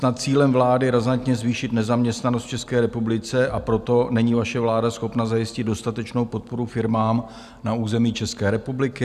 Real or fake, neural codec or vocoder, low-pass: real; none; 14.4 kHz